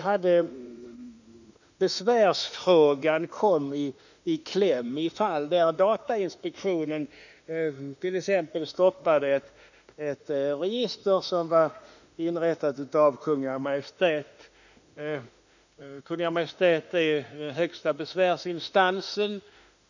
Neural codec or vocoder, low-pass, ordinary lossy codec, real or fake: autoencoder, 48 kHz, 32 numbers a frame, DAC-VAE, trained on Japanese speech; 7.2 kHz; none; fake